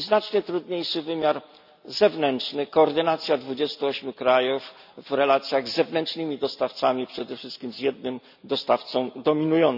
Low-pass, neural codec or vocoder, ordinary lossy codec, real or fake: 5.4 kHz; none; none; real